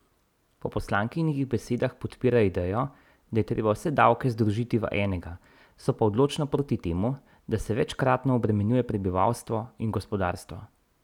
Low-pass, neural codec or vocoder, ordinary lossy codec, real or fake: 19.8 kHz; none; none; real